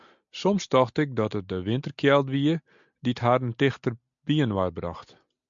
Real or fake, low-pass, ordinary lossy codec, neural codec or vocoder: real; 7.2 kHz; MP3, 64 kbps; none